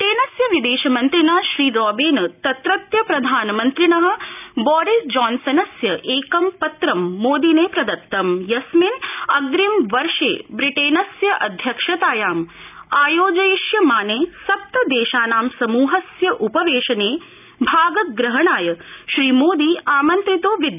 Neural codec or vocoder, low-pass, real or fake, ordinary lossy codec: none; 3.6 kHz; real; none